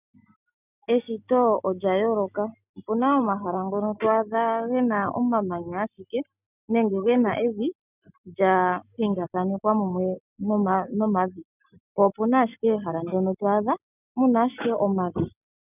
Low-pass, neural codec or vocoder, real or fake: 3.6 kHz; none; real